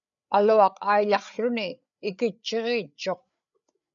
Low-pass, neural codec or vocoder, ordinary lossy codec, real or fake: 7.2 kHz; codec, 16 kHz, 8 kbps, FreqCodec, larger model; MP3, 96 kbps; fake